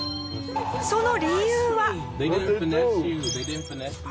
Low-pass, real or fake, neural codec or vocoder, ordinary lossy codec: none; real; none; none